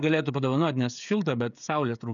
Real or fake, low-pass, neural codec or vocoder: fake; 7.2 kHz; codec, 16 kHz, 16 kbps, FreqCodec, smaller model